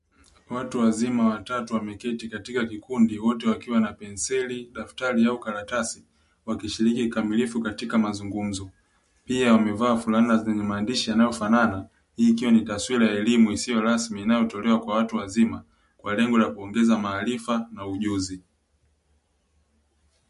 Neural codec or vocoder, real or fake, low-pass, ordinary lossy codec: none; real; 14.4 kHz; MP3, 48 kbps